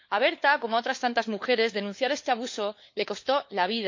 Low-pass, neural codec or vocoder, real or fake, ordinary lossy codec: 7.2 kHz; codec, 16 kHz, 4 kbps, FunCodec, trained on LibriTTS, 50 frames a second; fake; MP3, 48 kbps